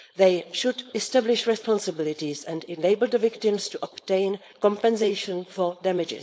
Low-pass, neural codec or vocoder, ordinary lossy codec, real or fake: none; codec, 16 kHz, 4.8 kbps, FACodec; none; fake